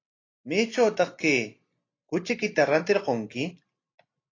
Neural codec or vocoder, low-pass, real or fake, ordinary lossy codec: none; 7.2 kHz; real; AAC, 32 kbps